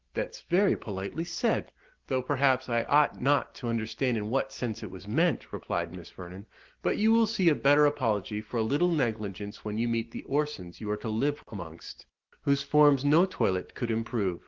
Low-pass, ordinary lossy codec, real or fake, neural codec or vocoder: 7.2 kHz; Opus, 16 kbps; real; none